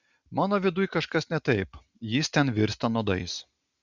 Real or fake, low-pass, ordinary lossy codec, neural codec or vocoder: real; 7.2 kHz; Opus, 64 kbps; none